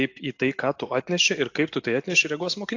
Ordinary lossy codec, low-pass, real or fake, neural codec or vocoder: AAC, 48 kbps; 7.2 kHz; real; none